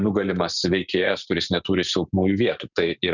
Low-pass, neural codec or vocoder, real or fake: 7.2 kHz; none; real